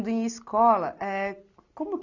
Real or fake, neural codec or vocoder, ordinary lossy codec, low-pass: real; none; none; 7.2 kHz